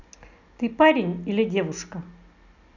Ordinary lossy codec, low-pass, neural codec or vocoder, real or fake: none; 7.2 kHz; none; real